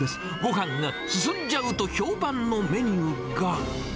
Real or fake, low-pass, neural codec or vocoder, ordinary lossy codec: real; none; none; none